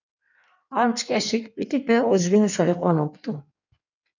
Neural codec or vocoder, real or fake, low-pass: codec, 16 kHz in and 24 kHz out, 1.1 kbps, FireRedTTS-2 codec; fake; 7.2 kHz